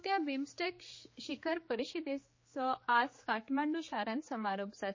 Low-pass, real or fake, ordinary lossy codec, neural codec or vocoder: 7.2 kHz; fake; MP3, 32 kbps; codec, 16 kHz, 4 kbps, X-Codec, HuBERT features, trained on general audio